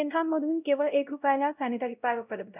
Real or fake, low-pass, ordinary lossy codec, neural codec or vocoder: fake; 3.6 kHz; none; codec, 16 kHz, 0.5 kbps, X-Codec, WavLM features, trained on Multilingual LibriSpeech